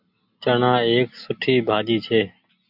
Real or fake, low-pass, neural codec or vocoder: real; 5.4 kHz; none